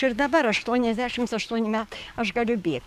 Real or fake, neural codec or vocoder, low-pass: fake; codec, 44.1 kHz, 7.8 kbps, DAC; 14.4 kHz